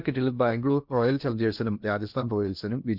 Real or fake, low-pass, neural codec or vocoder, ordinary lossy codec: fake; 5.4 kHz; codec, 16 kHz in and 24 kHz out, 0.8 kbps, FocalCodec, streaming, 65536 codes; none